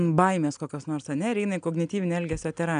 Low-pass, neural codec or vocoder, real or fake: 9.9 kHz; none; real